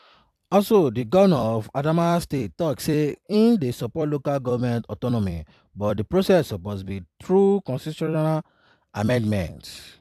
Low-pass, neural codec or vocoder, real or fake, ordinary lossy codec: 14.4 kHz; vocoder, 44.1 kHz, 128 mel bands every 256 samples, BigVGAN v2; fake; AAC, 96 kbps